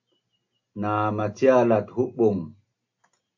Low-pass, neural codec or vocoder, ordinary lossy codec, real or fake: 7.2 kHz; none; AAC, 48 kbps; real